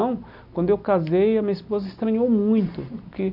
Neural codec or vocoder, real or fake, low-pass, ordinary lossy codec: none; real; 5.4 kHz; none